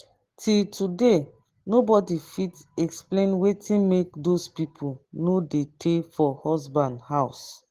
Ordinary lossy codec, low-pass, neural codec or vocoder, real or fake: Opus, 16 kbps; 14.4 kHz; none; real